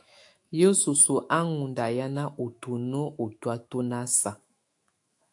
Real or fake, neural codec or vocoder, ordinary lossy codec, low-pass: fake; autoencoder, 48 kHz, 128 numbers a frame, DAC-VAE, trained on Japanese speech; AAC, 64 kbps; 10.8 kHz